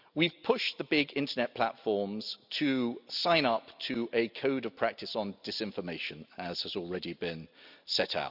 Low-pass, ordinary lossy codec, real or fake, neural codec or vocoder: 5.4 kHz; none; real; none